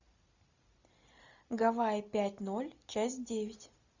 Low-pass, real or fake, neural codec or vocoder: 7.2 kHz; real; none